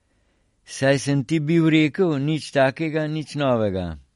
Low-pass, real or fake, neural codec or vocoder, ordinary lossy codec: 19.8 kHz; real; none; MP3, 48 kbps